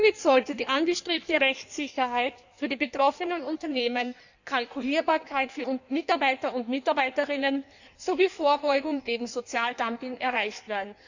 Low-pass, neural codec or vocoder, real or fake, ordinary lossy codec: 7.2 kHz; codec, 16 kHz in and 24 kHz out, 1.1 kbps, FireRedTTS-2 codec; fake; none